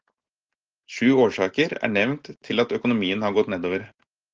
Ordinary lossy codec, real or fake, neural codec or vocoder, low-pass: Opus, 16 kbps; real; none; 7.2 kHz